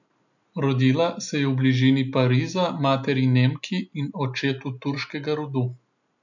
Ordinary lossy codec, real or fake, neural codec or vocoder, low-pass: none; real; none; 7.2 kHz